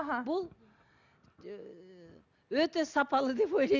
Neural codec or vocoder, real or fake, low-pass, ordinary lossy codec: none; real; 7.2 kHz; none